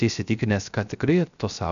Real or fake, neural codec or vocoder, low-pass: fake; codec, 16 kHz, 0.3 kbps, FocalCodec; 7.2 kHz